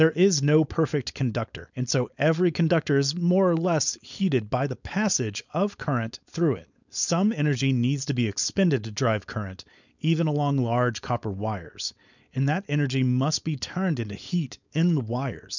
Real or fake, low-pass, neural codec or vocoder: fake; 7.2 kHz; codec, 16 kHz, 4.8 kbps, FACodec